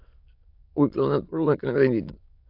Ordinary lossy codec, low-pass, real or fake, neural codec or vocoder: AAC, 48 kbps; 5.4 kHz; fake; autoencoder, 22.05 kHz, a latent of 192 numbers a frame, VITS, trained on many speakers